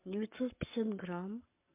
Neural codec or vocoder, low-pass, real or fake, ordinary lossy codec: codec, 44.1 kHz, 7.8 kbps, Pupu-Codec; 3.6 kHz; fake; MP3, 32 kbps